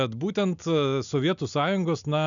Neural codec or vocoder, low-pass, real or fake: none; 7.2 kHz; real